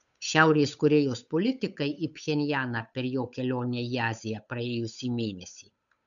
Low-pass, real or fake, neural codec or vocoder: 7.2 kHz; fake; codec, 16 kHz, 8 kbps, FunCodec, trained on Chinese and English, 25 frames a second